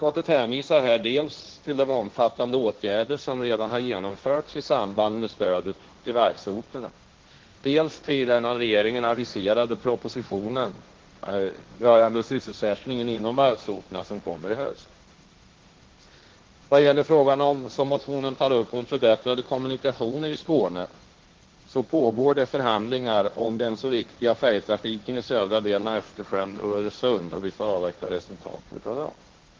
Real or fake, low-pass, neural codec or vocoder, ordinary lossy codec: fake; 7.2 kHz; codec, 16 kHz, 1.1 kbps, Voila-Tokenizer; Opus, 16 kbps